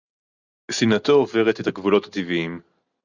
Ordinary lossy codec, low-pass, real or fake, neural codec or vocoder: Opus, 64 kbps; 7.2 kHz; real; none